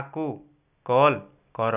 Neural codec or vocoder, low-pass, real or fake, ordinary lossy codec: none; 3.6 kHz; real; none